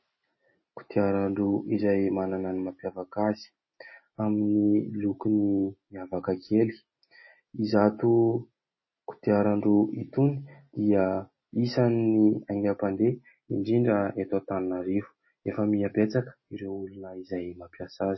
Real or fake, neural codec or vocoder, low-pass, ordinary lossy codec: real; none; 7.2 kHz; MP3, 24 kbps